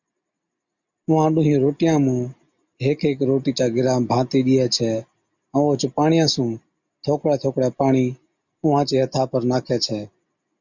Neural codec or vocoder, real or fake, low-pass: none; real; 7.2 kHz